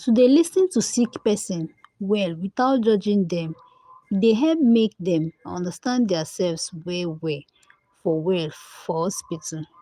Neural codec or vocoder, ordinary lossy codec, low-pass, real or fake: none; Opus, 32 kbps; 14.4 kHz; real